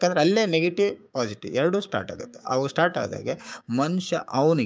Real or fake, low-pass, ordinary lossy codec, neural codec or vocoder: fake; none; none; codec, 16 kHz, 6 kbps, DAC